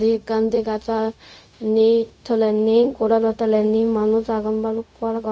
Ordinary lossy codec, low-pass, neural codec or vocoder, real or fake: none; none; codec, 16 kHz, 0.4 kbps, LongCat-Audio-Codec; fake